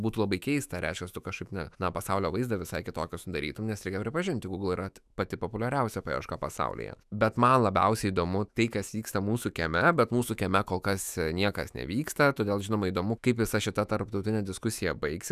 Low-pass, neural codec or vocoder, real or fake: 14.4 kHz; autoencoder, 48 kHz, 128 numbers a frame, DAC-VAE, trained on Japanese speech; fake